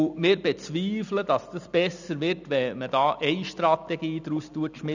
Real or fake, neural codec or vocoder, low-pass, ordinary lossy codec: real; none; 7.2 kHz; none